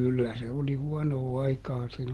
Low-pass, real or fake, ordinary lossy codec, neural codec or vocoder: 19.8 kHz; real; Opus, 16 kbps; none